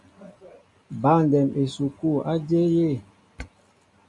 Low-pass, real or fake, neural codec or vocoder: 10.8 kHz; real; none